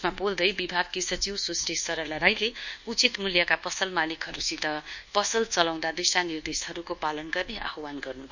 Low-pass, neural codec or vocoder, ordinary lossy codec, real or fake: 7.2 kHz; codec, 24 kHz, 1.2 kbps, DualCodec; none; fake